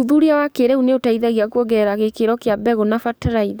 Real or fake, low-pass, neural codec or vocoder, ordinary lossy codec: fake; none; codec, 44.1 kHz, 7.8 kbps, DAC; none